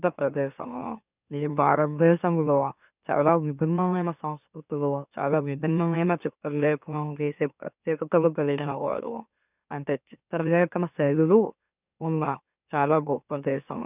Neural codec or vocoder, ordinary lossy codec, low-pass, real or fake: autoencoder, 44.1 kHz, a latent of 192 numbers a frame, MeloTTS; none; 3.6 kHz; fake